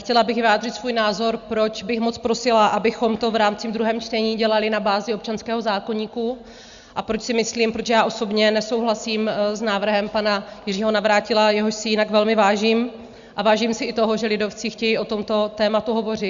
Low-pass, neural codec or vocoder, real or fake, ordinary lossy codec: 7.2 kHz; none; real; Opus, 64 kbps